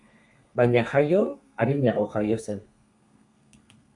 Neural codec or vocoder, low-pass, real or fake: codec, 32 kHz, 1.9 kbps, SNAC; 10.8 kHz; fake